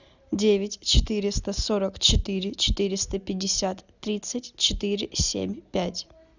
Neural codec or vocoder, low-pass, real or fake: none; 7.2 kHz; real